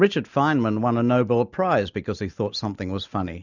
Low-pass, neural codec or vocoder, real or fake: 7.2 kHz; none; real